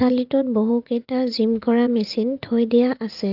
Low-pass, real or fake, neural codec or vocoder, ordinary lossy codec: 5.4 kHz; fake; vocoder, 44.1 kHz, 80 mel bands, Vocos; Opus, 32 kbps